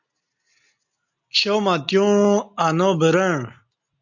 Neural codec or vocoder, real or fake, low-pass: none; real; 7.2 kHz